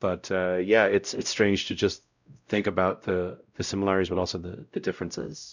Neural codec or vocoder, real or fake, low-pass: codec, 16 kHz, 0.5 kbps, X-Codec, WavLM features, trained on Multilingual LibriSpeech; fake; 7.2 kHz